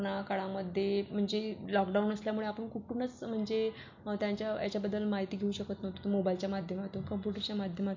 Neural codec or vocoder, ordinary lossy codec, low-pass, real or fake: none; MP3, 48 kbps; 7.2 kHz; real